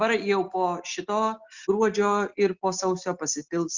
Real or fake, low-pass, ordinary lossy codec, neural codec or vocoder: real; 7.2 kHz; Opus, 64 kbps; none